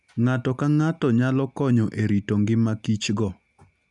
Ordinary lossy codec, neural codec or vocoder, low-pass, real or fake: none; none; 10.8 kHz; real